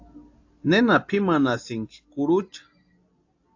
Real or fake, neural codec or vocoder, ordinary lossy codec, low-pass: real; none; AAC, 48 kbps; 7.2 kHz